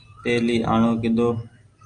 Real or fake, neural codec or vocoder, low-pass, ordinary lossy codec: real; none; 9.9 kHz; Opus, 32 kbps